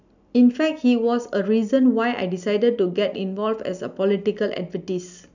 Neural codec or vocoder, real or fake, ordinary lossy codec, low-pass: none; real; none; 7.2 kHz